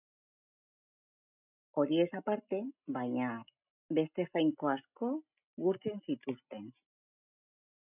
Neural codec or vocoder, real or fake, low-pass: none; real; 3.6 kHz